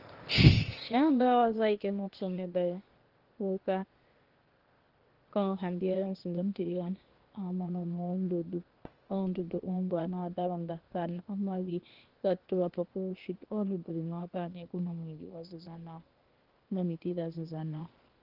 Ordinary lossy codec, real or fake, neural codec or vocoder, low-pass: Opus, 16 kbps; fake; codec, 16 kHz, 0.8 kbps, ZipCodec; 5.4 kHz